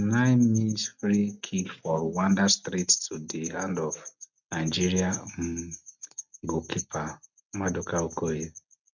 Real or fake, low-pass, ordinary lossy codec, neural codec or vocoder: real; 7.2 kHz; none; none